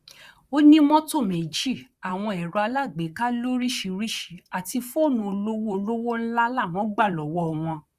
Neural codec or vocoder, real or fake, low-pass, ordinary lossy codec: vocoder, 44.1 kHz, 128 mel bands, Pupu-Vocoder; fake; 14.4 kHz; Opus, 64 kbps